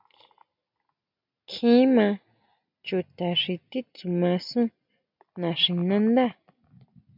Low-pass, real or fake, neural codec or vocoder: 5.4 kHz; real; none